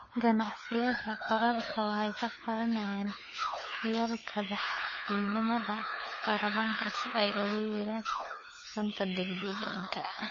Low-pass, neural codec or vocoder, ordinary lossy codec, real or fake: 7.2 kHz; codec, 16 kHz, 4 kbps, FunCodec, trained on LibriTTS, 50 frames a second; MP3, 32 kbps; fake